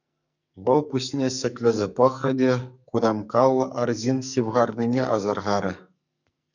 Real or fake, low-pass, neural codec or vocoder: fake; 7.2 kHz; codec, 44.1 kHz, 2.6 kbps, SNAC